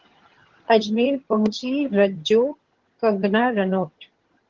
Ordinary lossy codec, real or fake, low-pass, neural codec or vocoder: Opus, 16 kbps; fake; 7.2 kHz; vocoder, 22.05 kHz, 80 mel bands, HiFi-GAN